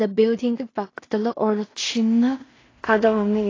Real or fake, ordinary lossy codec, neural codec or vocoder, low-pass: fake; AAC, 32 kbps; codec, 16 kHz in and 24 kHz out, 0.4 kbps, LongCat-Audio-Codec, two codebook decoder; 7.2 kHz